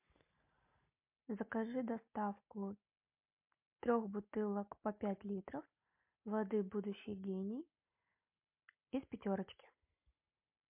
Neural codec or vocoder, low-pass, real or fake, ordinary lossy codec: none; 3.6 kHz; real; MP3, 32 kbps